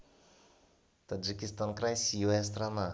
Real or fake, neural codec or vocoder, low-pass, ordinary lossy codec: real; none; none; none